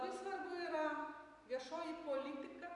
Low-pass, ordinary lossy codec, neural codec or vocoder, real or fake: 10.8 kHz; MP3, 96 kbps; none; real